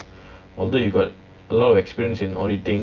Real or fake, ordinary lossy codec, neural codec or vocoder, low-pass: fake; Opus, 24 kbps; vocoder, 24 kHz, 100 mel bands, Vocos; 7.2 kHz